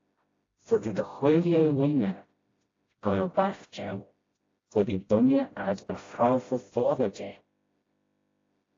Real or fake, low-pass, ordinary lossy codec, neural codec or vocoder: fake; 7.2 kHz; AAC, 32 kbps; codec, 16 kHz, 0.5 kbps, FreqCodec, smaller model